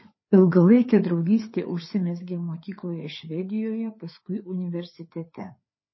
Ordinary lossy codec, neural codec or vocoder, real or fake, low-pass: MP3, 24 kbps; codec, 16 kHz, 4 kbps, FreqCodec, larger model; fake; 7.2 kHz